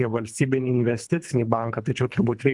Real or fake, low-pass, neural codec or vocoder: fake; 10.8 kHz; codec, 24 kHz, 3 kbps, HILCodec